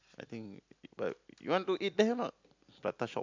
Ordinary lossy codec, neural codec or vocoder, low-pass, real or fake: MP3, 64 kbps; none; 7.2 kHz; real